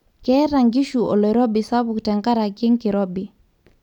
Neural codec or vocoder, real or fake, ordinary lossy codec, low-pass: vocoder, 44.1 kHz, 128 mel bands every 256 samples, BigVGAN v2; fake; none; 19.8 kHz